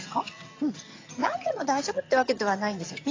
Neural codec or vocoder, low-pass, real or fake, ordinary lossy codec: vocoder, 22.05 kHz, 80 mel bands, HiFi-GAN; 7.2 kHz; fake; AAC, 32 kbps